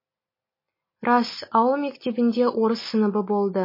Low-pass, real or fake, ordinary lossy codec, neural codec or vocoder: 5.4 kHz; real; MP3, 24 kbps; none